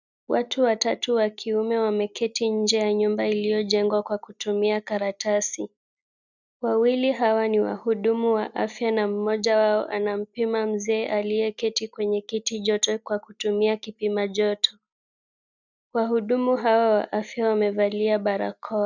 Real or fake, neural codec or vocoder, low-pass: real; none; 7.2 kHz